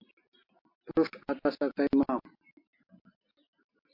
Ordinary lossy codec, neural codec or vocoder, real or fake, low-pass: MP3, 32 kbps; none; real; 5.4 kHz